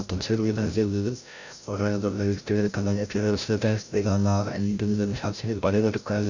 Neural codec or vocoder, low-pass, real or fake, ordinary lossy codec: codec, 16 kHz, 0.5 kbps, FreqCodec, larger model; 7.2 kHz; fake; none